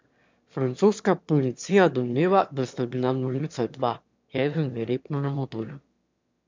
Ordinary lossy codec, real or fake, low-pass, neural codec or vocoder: MP3, 64 kbps; fake; 7.2 kHz; autoencoder, 22.05 kHz, a latent of 192 numbers a frame, VITS, trained on one speaker